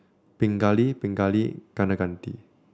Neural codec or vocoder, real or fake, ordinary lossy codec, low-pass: none; real; none; none